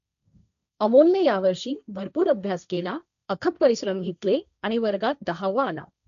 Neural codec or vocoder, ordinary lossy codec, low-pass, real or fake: codec, 16 kHz, 1.1 kbps, Voila-Tokenizer; none; 7.2 kHz; fake